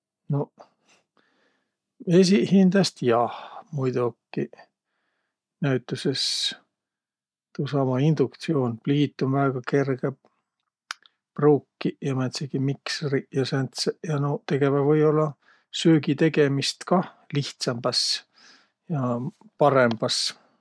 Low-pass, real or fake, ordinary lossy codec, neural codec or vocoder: none; real; none; none